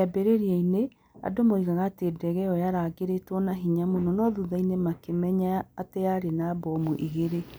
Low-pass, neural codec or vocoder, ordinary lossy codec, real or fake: none; none; none; real